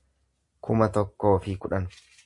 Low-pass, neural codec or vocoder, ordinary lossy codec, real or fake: 10.8 kHz; none; AAC, 64 kbps; real